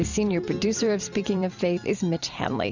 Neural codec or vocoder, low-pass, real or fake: vocoder, 44.1 kHz, 128 mel bands every 256 samples, BigVGAN v2; 7.2 kHz; fake